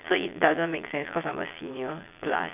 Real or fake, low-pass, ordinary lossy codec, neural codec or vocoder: fake; 3.6 kHz; none; vocoder, 22.05 kHz, 80 mel bands, Vocos